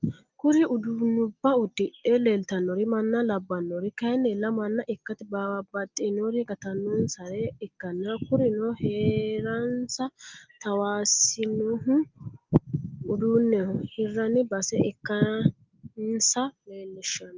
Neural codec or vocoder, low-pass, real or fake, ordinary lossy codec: none; 7.2 kHz; real; Opus, 24 kbps